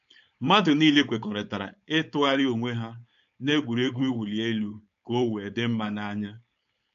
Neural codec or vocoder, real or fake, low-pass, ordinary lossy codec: codec, 16 kHz, 4.8 kbps, FACodec; fake; 7.2 kHz; none